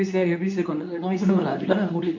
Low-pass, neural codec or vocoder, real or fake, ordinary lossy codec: 7.2 kHz; codec, 24 kHz, 0.9 kbps, WavTokenizer, medium speech release version 2; fake; MP3, 48 kbps